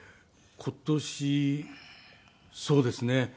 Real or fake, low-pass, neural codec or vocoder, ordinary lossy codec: real; none; none; none